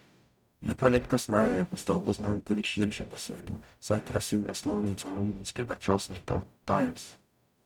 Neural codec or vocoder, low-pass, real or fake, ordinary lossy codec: codec, 44.1 kHz, 0.9 kbps, DAC; 19.8 kHz; fake; none